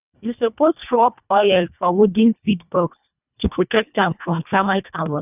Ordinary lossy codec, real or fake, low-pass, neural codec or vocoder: none; fake; 3.6 kHz; codec, 24 kHz, 1.5 kbps, HILCodec